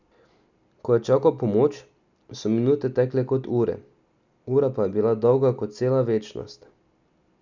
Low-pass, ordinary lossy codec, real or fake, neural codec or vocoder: 7.2 kHz; none; real; none